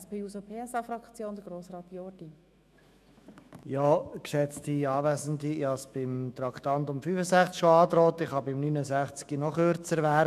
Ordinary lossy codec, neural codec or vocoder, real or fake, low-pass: none; autoencoder, 48 kHz, 128 numbers a frame, DAC-VAE, trained on Japanese speech; fake; 14.4 kHz